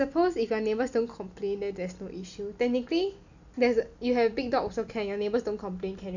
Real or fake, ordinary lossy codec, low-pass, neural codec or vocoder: real; none; 7.2 kHz; none